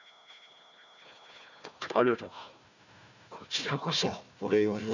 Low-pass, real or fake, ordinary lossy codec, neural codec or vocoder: 7.2 kHz; fake; none; codec, 16 kHz, 1 kbps, FunCodec, trained on Chinese and English, 50 frames a second